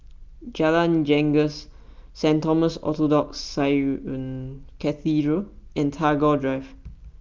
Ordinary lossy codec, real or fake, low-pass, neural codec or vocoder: Opus, 32 kbps; real; 7.2 kHz; none